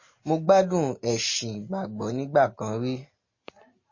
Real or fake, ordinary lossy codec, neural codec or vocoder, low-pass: real; MP3, 32 kbps; none; 7.2 kHz